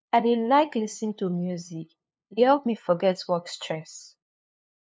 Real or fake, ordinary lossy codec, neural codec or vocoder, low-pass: fake; none; codec, 16 kHz, 2 kbps, FunCodec, trained on LibriTTS, 25 frames a second; none